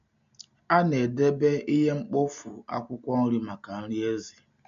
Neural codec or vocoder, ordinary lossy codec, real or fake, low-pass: none; AAC, 96 kbps; real; 7.2 kHz